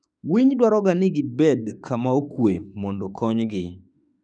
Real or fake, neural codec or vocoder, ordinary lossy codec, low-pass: fake; autoencoder, 48 kHz, 32 numbers a frame, DAC-VAE, trained on Japanese speech; none; 9.9 kHz